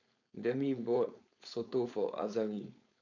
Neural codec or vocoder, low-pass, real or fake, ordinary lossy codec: codec, 16 kHz, 4.8 kbps, FACodec; 7.2 kHz; fake; none